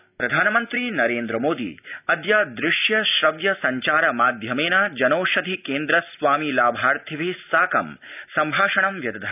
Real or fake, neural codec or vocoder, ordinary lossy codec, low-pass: real; none; none; 3.6 kHz